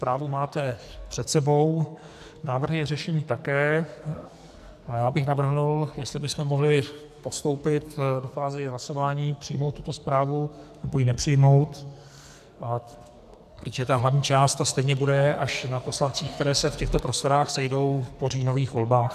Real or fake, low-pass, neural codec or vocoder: fake; 14.4 kHz; codec, 44.1 kHz, 2.6 kbps, SNAC